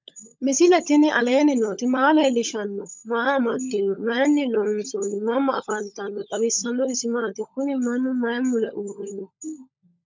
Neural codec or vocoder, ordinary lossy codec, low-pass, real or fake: codec, 16 kHz, 16 kbps, FunCodec, trained on LibriTTS, 50 frames a second; MP3, 64 kbps; 7.2 kHz; fake